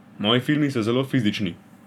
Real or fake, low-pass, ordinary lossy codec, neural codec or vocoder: fake; 19.8 kHz; none; vocoder, 44.1 kHz, 128 mel bands every 512 samples, BigVGAN v2